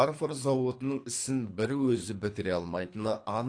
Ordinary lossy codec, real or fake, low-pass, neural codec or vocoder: AAC, 48 kbps; fake; 9.9 kHz; codec, 24 kHz, 3 kbps, HILCodec